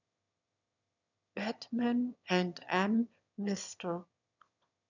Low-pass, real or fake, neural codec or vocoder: 7.2 kHz; fake; autoencoder, 22.05 kHz, a latent of 192 numbers a frame, VITS, trained on one speaker